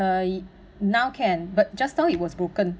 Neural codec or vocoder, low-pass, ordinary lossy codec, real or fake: none; none; none; real